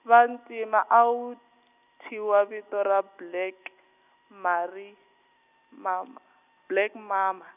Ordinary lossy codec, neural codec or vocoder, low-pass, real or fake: none; none; 3.6 kHz; real